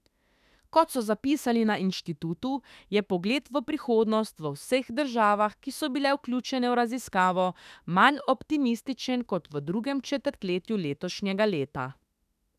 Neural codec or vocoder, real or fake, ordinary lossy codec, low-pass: autoencoder, 48 kHz, 32 numbers a frame, DAC-VAE, trained on Japanese speech; fake; none; 14.4 kHz